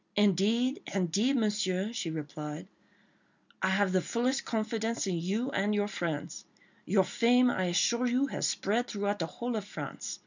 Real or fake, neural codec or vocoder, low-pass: real; none; 7.2 kHz